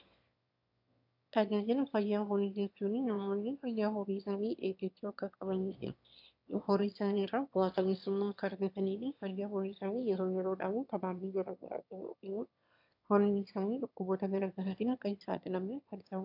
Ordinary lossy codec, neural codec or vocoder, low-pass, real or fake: AAC, 48 kbps; autoencoder, 22.05 kHz, a latent of 192 numbers a frame, VITS, trained on one speaker; 5.4 kHz; fake